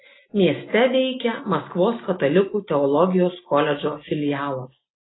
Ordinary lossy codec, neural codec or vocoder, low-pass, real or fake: AAC, 16 kbps; none; 7.2 kHz; real